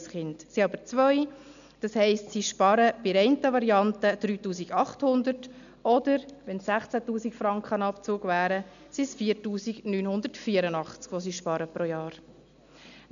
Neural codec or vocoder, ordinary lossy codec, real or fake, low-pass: none; none; real; 7.2 kHz